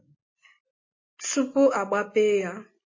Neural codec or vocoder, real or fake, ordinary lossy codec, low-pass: none; real; MP3, 32 kbps; 7.2 kHz